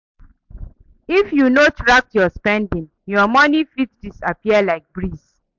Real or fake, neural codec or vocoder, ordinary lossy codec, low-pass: real; none; MP3, 64 kbps; 7.2 kHz